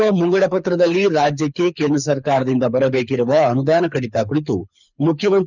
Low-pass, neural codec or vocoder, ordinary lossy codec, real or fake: 7.2 kHz; codec, 24 kHz, 6 kbps, HILCodec; none; fake